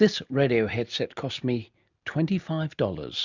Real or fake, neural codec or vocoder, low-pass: real; none; 7.2 kHz